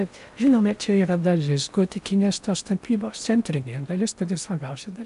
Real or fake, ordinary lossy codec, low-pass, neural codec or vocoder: fake; AAC, 96 kbps; 10.8 kHz; codec, 16 kHz in and 24 kHz out, 0.8 kbps, FocalCodec, streaming, 65536 codes